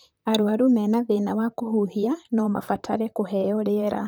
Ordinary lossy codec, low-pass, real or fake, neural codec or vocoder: none; none; fake; vocoder, 44.1 kHz, 128 mel bands, Pupu-Vocoder